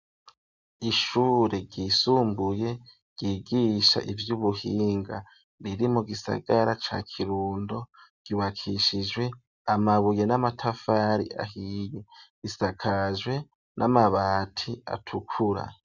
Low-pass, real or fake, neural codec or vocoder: 7.2 kHz; real; none